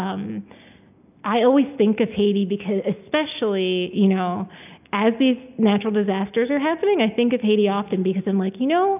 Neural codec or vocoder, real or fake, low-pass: none; real; 3.6 kHz